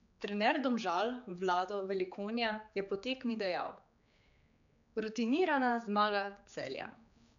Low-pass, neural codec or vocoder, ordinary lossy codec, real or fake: 7.2 kHz; codec, 16 kHz, 4 kbps, X-Codec, HuBERT features, trained on general audio; none; fake